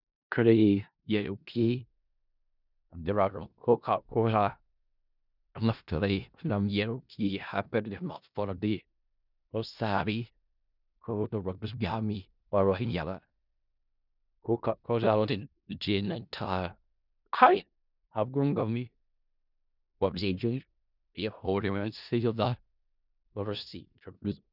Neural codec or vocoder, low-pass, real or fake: codec, 16 kHz in and 24 kHz out, 0.4 kbps, LongCat-Audio-Codec, four codebook decoder; 5.4 kHz; fake